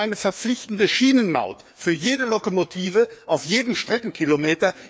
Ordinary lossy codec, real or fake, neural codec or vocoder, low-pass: none; fake; codec, 16 kHz, 2 kbps, FreqCodec, larger model; none